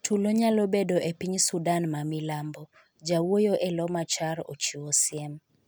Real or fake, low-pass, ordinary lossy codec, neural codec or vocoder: real; none; none; none